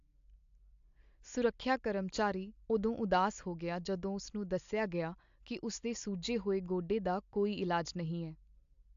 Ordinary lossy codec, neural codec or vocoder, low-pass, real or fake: AAC, 64 kbps; none; 7.2 kHz; real